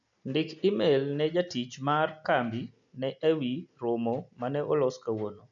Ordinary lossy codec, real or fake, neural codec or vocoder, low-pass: none; real; none; 7.2 kHz